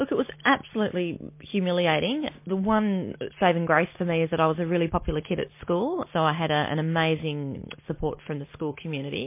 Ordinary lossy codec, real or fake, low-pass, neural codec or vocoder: MP3, 24 kbps; real; 3.6 kHz; none